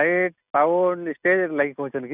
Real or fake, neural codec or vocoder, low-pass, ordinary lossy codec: real; none; 3.6 kHz; none